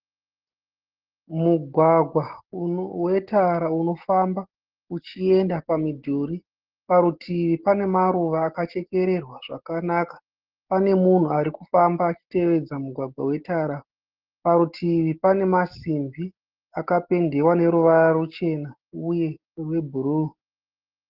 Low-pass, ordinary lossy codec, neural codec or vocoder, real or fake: 5.4 kHz; Opus, 16 kbps; none; real